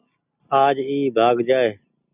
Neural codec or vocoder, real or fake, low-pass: none; real; 3.6 kHz